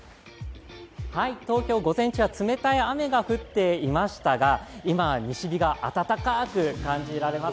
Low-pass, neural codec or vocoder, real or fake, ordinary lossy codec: none; none; real; none